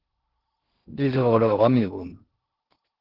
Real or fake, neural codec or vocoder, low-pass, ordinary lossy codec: fake; codec, 16 kHz in and 24 kHz out, 0.6 kbps, FocalCodec, streaming, 2048 codes; 5.4 kHz; Opus, 16 kbps